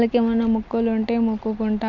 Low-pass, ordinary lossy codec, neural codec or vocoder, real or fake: 7.2 kHz; none; none; real